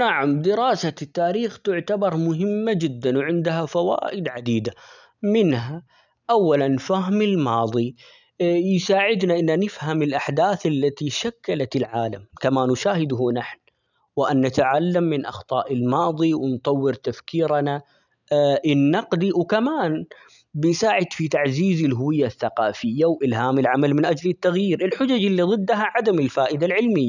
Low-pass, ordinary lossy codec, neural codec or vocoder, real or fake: 7.2 kHz; none; none; real